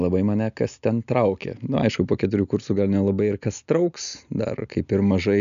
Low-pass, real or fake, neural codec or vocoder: 7.2 kHz; real; none